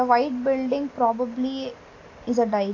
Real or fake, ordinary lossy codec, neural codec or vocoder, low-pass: real; AAC, 32 kbps; none; 7.2 kHz